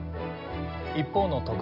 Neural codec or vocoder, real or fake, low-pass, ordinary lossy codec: none; real; 5.4 kHz; none